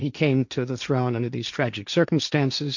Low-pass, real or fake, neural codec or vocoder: 7.2 kHz; fake; codec, 16 kHz, 1.1 kbps, Voila-Tokenizer